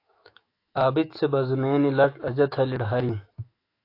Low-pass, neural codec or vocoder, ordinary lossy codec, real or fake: 5.4 kHz; autoencoder, 48 kHz, 128 numbers a frame, DAC-VAE, trained on Japanese speech; AAC, 32 kbps; fake